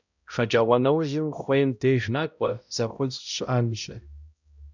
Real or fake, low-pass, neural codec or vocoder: fake; 7.2 kHz; codec, 16 kHz, 0.5 kbps, X-Codec, HuBERT features, trained on balanced general audio